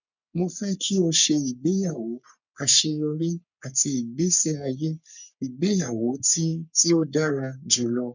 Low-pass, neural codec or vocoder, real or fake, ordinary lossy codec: 7.2 kHz; codec, 44.1 kHz, 3.4 kbps, Pupu-Codec; fake; none